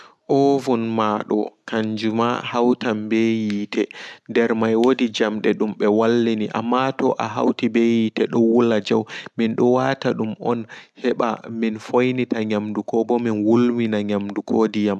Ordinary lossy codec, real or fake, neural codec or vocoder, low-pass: none; real; none; none